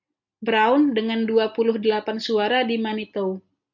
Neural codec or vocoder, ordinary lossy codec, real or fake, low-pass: none; MP3, 64 kbps; real; 7.2 kHz